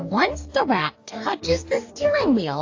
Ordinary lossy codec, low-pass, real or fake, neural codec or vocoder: AAC, 48 kbps; 7.2 kHz; fake; codec, 44.1 kHz, 2.6 kbps, DAC